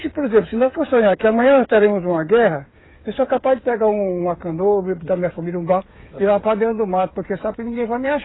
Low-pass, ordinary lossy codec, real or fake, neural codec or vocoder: 7.2 kHz; AAC, 16 kbps; fake; codec, 16 kHz, 8 kbps, FreqCodec, smaller model